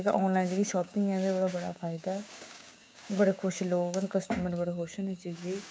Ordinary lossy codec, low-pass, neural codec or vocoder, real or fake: none; none; codec, 16 kHz, 6 kbps, DAC; fake